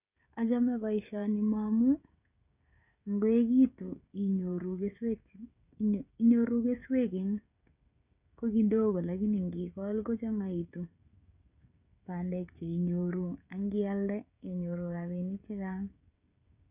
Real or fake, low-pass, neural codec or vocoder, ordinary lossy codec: fake; 3.6 kHz; codec, 16 kHz, 16 kbps, FreqCodec, smaller model; none